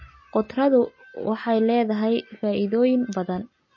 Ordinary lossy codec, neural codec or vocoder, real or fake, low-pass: MP3, 32 kbps; none; real; 7.2 kHz